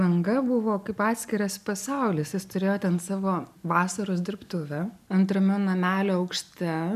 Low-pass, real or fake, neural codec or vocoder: 14.4 kHz; real; none